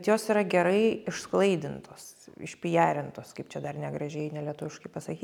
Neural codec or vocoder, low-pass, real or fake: none; 19.8 kHz; real